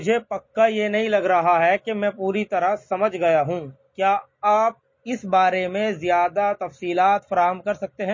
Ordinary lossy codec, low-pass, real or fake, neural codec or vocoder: MP3, 32 kbps; 7.2 kHz; real; none